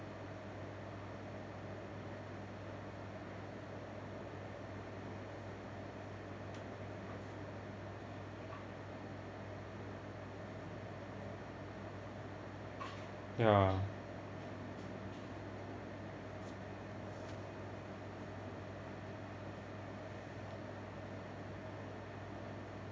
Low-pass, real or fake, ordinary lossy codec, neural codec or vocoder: none; real; none; none